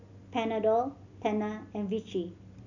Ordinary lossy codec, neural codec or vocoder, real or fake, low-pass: none; none; real; 7.2 kHz